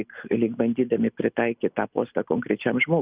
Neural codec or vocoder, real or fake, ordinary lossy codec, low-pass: none; real; Opus, 64 kbps; 3.6 kHz